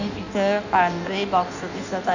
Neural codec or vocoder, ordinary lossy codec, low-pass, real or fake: codec, 16 kHz in and 24 kHz out, 1.1 kbps, FireRedTTS-2 codec; none; 7.2 kHz; fake